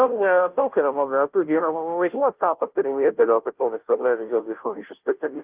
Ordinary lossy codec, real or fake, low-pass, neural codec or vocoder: Opus, 32 kbps; fake; 3.6 kHz; codec, 16 kHz, 0.5 kbps, FunCodec, trained on Chinese and English, 25 frames a second